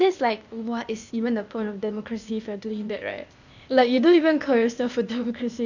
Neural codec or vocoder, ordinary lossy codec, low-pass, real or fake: codec, 16 kHz, 0.8 kbps, ZipCodec; none; 7.2 kHz; fake